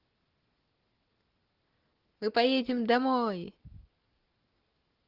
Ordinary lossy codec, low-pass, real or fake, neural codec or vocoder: Opus, 16 kbps; 5.4 kHz; real; none